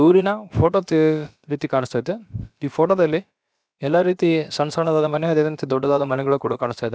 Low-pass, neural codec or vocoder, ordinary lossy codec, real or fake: none; codec, 16 kHz, about 1 kbps, DyCAST, with the encoder's durations; none; fake